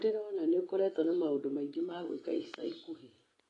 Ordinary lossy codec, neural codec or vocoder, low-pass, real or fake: AAC, 32 kbps; vocoder, 24 kHz, 100 mel bands, Vocos; 10.8 kHz; fake